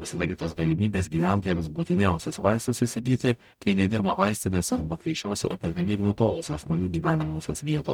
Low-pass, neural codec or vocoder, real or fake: 19.8 kHz; codec, 44.1 kHz, 0.9 kbps, DAC; fake